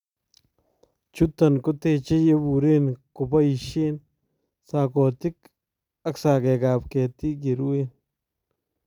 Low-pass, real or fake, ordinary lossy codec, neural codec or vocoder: 19.8 kHz; real; none; none